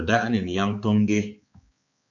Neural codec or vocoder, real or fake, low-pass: codec, 16 kHz, 4 kbps, X-Codec, HuBERT features, trained on balanced general audio; fake; 7.2 kHz